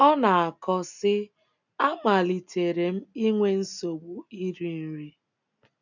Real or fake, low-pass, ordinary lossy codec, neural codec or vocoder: real; 7.2 kHz; none; none